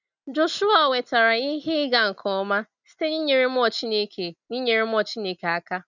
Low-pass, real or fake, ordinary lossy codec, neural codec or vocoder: 7.2 kHz; real; none; none